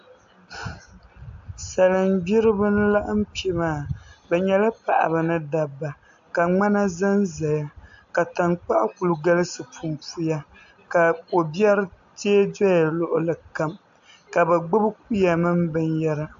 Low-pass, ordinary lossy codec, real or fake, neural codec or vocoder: 7.2 kHz; AAC, 64 kbps; real; none